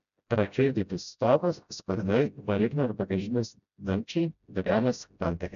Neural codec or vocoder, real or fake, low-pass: codec, 16 kHz, 0.5 kbps, FreqCodec, smaller model; fake; 7.2 kHz